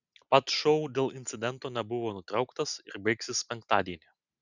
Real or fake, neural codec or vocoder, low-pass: real; none; 7.2 kHz